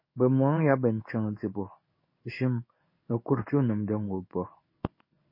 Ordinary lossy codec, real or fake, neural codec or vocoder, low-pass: MP3, 24 kbps; fake; codec, 16 kHz in and 24 kHz out, 1 kbps, XY-Tokenizer; 5.4 kHz